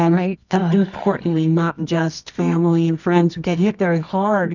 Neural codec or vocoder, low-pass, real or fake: codec, 24 kHz, 0.9 kbps, WavTokenizer, medium music audio release; 7.2 kHz; fake